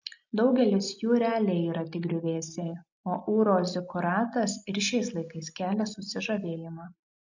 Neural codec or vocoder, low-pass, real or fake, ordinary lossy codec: none; 7.2 kHz; real; MP3, 64 kbps